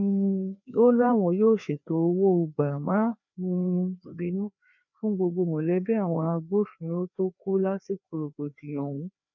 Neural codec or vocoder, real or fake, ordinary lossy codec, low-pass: codec, 16 kHz, 2 kbps, FreqCodec, larger model; fake; none; 7.2 kHz